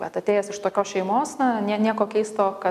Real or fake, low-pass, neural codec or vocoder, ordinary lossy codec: real; 14.4 kHz; none; MP3, 96 kbps